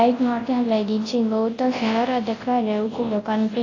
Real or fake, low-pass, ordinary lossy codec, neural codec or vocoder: fake; 7.2 kHz; AAC, 32 kbps; codec, 24 kHz, 0.9 kbps, WavTokenizer, large speech release